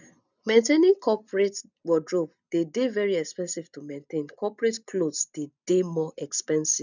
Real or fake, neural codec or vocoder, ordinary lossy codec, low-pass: real; none; none; 7.2 kHz